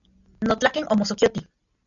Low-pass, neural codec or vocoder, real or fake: 7.2 kHz; none; real